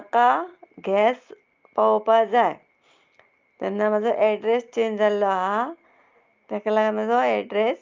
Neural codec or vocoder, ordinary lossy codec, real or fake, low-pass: none; Opus, 24 kbps; real; 7.2 kHz